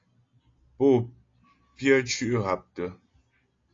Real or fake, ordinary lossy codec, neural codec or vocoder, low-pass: real; AAC, 32 kbps; none; 7.2 kHz